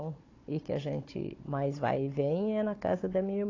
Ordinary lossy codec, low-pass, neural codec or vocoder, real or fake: AAC, 32 kbps; 7.2 kHz; codec, 16 kHz, 16 kbps, FunCodec, trained on Chinese and English, 50 frames a second; fake